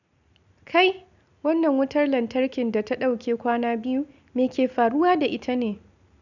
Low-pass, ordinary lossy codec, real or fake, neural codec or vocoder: 7.2 kHz; none; real; none